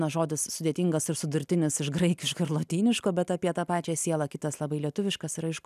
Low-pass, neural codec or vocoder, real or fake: 14.4 kHz; none; real